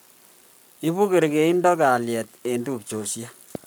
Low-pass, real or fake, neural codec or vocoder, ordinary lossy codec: none; fake; codec, 44.1 kHz, 7.8 kbps, Pupu-Codec; none